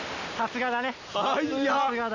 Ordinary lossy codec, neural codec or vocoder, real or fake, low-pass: none; none; real; 7.2 kHz